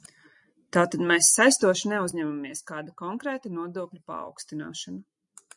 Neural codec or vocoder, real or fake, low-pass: none; real; 10.8 kHz